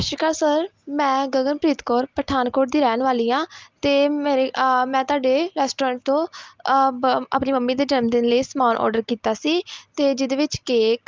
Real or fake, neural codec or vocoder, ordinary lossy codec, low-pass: real; none; Opus, 24 kbps; 7.2 kHz